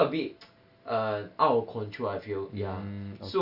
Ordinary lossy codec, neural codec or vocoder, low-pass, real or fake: Opus, 64 kbps; none; 5.4 kHz; real